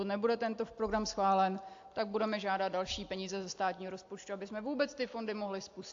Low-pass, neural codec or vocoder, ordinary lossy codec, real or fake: 7.2 kHz; none; AAC, 48 kbps; real